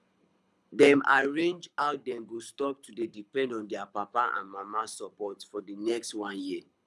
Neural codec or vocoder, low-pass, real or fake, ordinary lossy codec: codec, 24 kHz, 6 kbps, HILCodec; none; fake; none